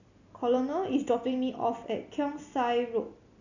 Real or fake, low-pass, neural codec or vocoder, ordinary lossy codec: real; 7.2 kHz; none; none